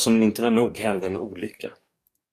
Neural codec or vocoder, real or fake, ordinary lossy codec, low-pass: codec, 44.1 kHz, 2.6 kbps, DAC; fake; AAC, 64 kbps; 14.4 kHz